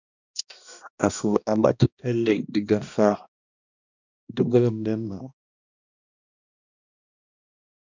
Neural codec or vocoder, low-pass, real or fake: codec, 16 kHz, 1 kbps, X-Codec, HuBERT features, trained on balanced general audio; 7.2 kHz; fake